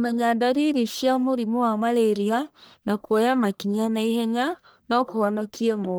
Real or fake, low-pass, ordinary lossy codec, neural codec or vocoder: fake; none; none; codec, 44.1 kHz, 1.7 kbps, Pupu-Codec